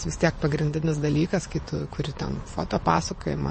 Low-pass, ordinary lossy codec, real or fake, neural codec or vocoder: 9.9 kHz; MP3, 32 kbps; fake; vocoder, 22.05 kHz, 80 mel bands, WaveNeXt